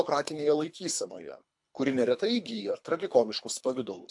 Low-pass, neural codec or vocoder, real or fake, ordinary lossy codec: 10.8 kHz; codec, 24 kHz, 3 kbps, HILCodec; fake; AAC, 64 kbps